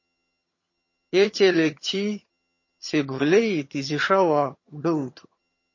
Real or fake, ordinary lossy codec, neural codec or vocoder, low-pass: fake; MP3, 32 kbps; vocoder, 22.05 kHz, 80 mel bands, HiFi-GAN; 7.2 kHz